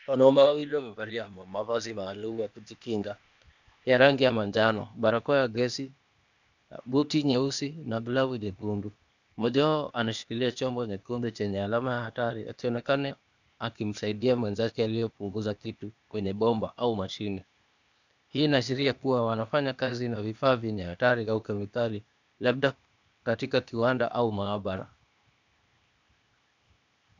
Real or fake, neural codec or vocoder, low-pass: fake; codec, 16 kHz, 0.8 kbps, ZipCodec; 7.2 kHz